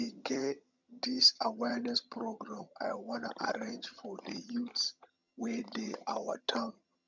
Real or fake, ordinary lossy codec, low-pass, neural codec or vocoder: fake; none; 7.2 kHz; vocoder, 22.05 kHz, 80 mel bands, HiFi-GAN